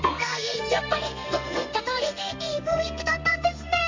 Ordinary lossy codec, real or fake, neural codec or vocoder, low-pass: MP3, 64 kbps; fake; codec, 32 kHz, 1.9 kbps, SNAC; 7.2 kHz